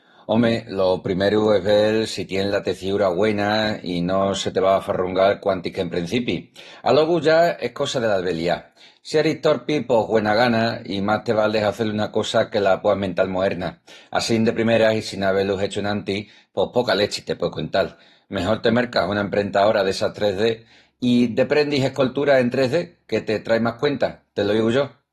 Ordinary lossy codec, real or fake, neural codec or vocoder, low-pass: AAC, 32 kbps; real; none; 19.8 kHz